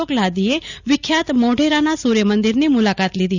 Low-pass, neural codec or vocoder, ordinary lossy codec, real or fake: 7.2 kHz; none; none; real